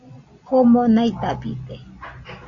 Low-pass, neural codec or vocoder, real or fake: 7.2 kHz; none; real